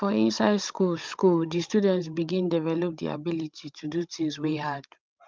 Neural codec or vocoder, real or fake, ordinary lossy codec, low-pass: codec, 16 kHz, 16 kbps, FreqCodec, larger model; fake; Opus, 24 kbps; 7.2 kHz